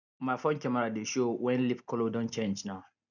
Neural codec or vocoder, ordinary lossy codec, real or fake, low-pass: none; none; real; 7.2 kHz